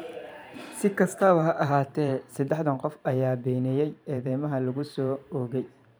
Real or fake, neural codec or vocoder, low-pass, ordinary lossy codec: fake; vocoder, 44.1 kHz, 128 mel bands every 512 samples, BigVGAN v2; none; none